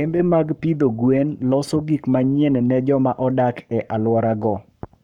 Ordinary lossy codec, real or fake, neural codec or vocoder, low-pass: none; fake; codec, 44.1 kHz, 7.8 kbps, Pupu-Codec; 19.8 kHz